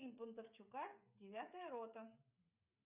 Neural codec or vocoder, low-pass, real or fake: codec, 16 kHz, 16 kbps, FreqCodec, smaller model; 3.6 kHz; fake